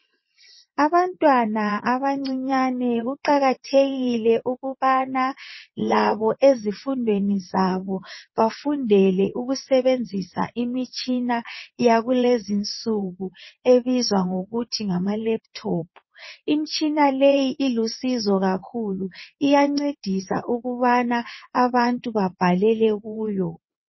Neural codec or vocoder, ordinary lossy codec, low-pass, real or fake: vocoder, 22.05 kHz, 80 mel bands, WaveNeXt; MP3, 24 kbps; 7.2 kHz; fake